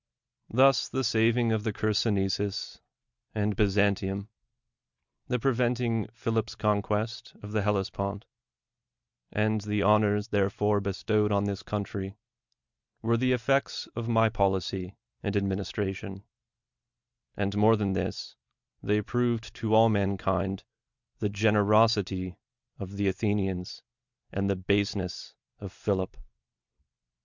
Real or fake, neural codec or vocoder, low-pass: real; none; 7.2 kHz